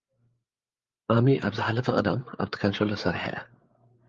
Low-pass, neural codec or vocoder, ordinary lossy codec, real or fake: 7.2 kHz; codec, 16 kHz, 8 kbps, FreqCodec, larger model; Opus, 32 kbps; fake